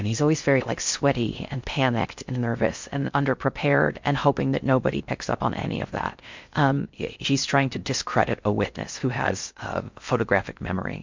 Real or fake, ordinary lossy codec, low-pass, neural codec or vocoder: fake; MP3, 64 kbps; 7.2 kHz; codec, 16 kHz in and 24 kHz out, 0.6 kbps, FocalCodec, streaming, 4096 codes